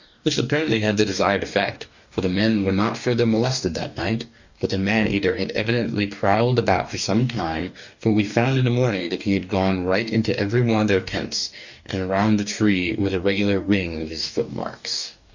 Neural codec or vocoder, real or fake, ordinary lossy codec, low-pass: codec, 44.1 kHz, 2.6 kbps, DAC; fake; Opus, 64 kbps; 7.2 kHz